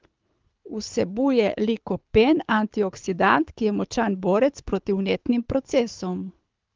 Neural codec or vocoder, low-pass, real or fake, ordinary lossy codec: codec, 24 kHz, 6 kbps, HILCodec; 7.2 kHz; fake; Opus, 32 kbps